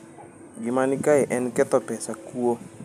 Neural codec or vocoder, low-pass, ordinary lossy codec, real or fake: none; 14.4 kHz; none; real